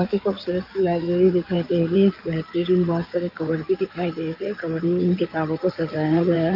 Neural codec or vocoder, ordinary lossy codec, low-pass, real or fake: codec, 16 kHz in and 24 kHz out, 2.2 kbps, FireRedTTS-2 codec; Opus, 24 kbps; 5.4 kHz; fake